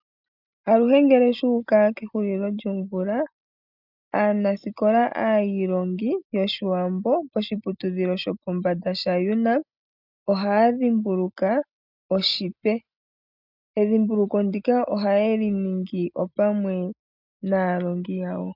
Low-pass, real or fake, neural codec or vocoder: 5.4 kHz; real; none